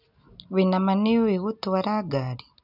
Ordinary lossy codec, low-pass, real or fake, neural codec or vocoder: none; 5.4 kHz; real; none